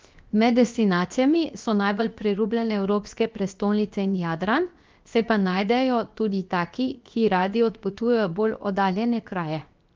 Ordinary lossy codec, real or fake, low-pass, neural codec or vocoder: Opus, 24 kbps; fake; 7.2 kHz; codec, 16 kHz, 0.7 kbps, FocalCodec